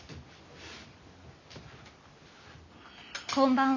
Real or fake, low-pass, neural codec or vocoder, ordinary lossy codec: real; 7.2 kHz; none; none